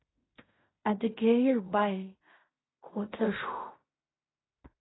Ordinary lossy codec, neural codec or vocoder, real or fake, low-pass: AAC, 16 kbps; codec, 16 kHz in and 24 kHz out, 0.4 kbps, LongCat-Audio-Codec, fine tuned four codebook decoder; fake; 7.2 kHz